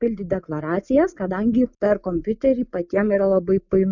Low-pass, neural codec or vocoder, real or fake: 7.2 kHz; none; real